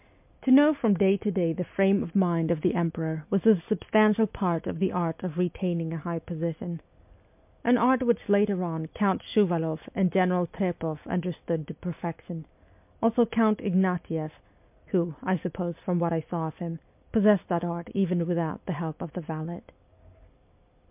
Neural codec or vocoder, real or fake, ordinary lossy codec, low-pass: none; real; MP3, 32 kbps; 3.6 kHz